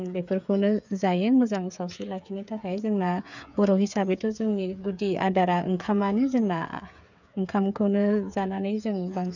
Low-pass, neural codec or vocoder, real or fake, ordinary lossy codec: 7.2 kHz; codec, 16 kHz, 8 kbps, FreqCodec, smaller model; fake; none